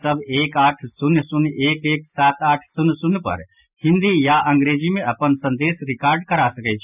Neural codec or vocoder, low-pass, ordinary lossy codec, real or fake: none; 3.6 kHz; none; real